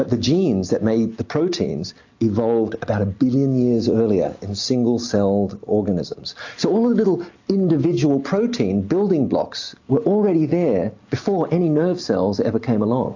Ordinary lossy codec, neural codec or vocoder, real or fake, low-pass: AAC, 48 kbps; none; real; 7.2 kHz